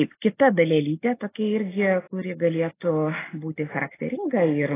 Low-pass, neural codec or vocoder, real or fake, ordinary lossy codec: 3.6 kHz; none; real; AAC, 16 kbps